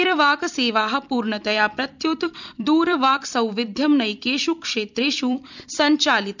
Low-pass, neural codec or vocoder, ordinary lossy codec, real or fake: 7.2 kHz; vocoder, 44.1 kHz, 128 mel bands every 256 samples, BigVGAN v2; none; fake